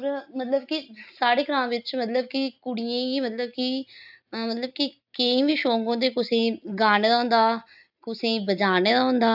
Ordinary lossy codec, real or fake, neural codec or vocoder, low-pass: AAC, 48 kbps; real; none; 5.4 kHz